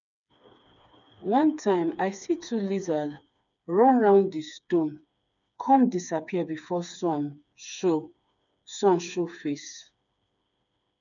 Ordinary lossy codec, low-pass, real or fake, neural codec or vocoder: none; 7.2 kHz; fake; codec, 16 kHz, 4 kbps, FreqCodec, smaller model